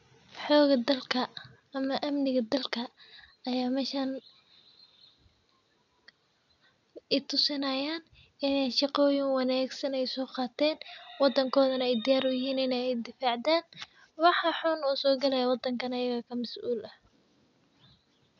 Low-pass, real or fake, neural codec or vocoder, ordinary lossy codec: 7.2 kHz; real; none; none